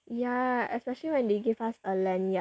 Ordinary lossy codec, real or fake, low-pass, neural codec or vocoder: none; real; none; none